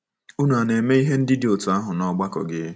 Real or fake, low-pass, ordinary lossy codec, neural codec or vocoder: real; none; none; none